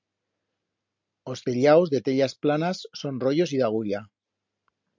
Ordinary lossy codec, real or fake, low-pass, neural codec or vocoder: MP3, 64 kbps; real; 7.2 kHz; none